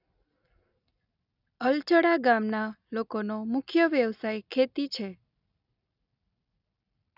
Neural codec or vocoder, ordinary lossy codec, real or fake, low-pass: none; none; real; 5.4 kHz